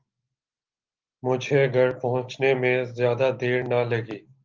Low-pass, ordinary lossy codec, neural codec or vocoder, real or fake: 7.2 kHz; Opus, 24 kbps; none; real